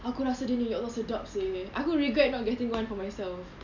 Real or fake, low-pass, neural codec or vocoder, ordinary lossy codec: real; 7.2 kHz; none; none